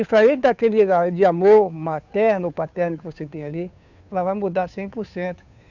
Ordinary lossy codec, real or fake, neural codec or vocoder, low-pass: none; fake; codec, 16 kHz, 2 kbps, FunCodec, trained on Chinese and English, 25 frames a second; 7.2 kHz